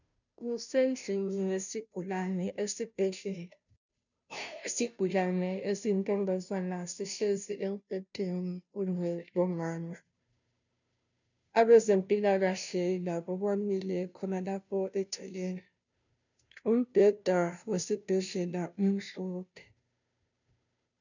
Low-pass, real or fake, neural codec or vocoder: 7.2 kHz; fake; codec, 16 kHz, 0.5 kbps, FunCodec, trained on Chinese and English, 25 frames a second